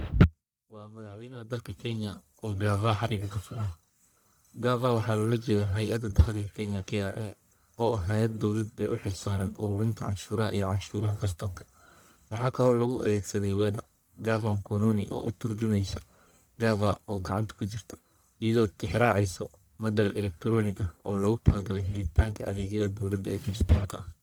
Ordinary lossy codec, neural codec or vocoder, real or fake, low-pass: none; codec, 44.1 kHz, 1.7 kbps, Pupu-Codec; fake; none